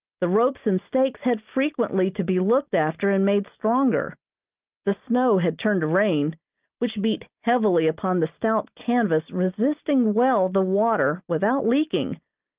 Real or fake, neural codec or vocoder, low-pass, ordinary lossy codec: real; none; 3.6 kHz; Opus, 24 kbps